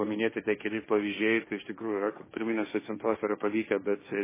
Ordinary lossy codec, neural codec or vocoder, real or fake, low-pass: MP3, 16 kbps; codec, 16 kHz, 1.1 kbps, Voila-Tokenizer; fake; 3.6 kHz